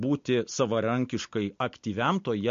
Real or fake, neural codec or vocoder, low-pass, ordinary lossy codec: real; none; 7.2 kHz; MP3, 48 kbps